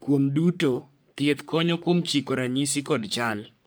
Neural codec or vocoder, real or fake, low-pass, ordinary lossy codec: codec, 44.1 kHz, 3.4 kbps, Pupu-Codec; fake; none; none